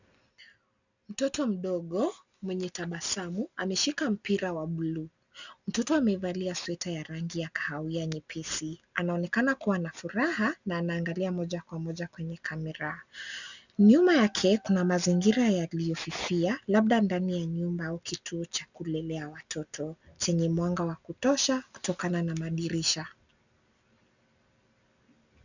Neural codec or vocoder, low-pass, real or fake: none; 7.2 kHz; real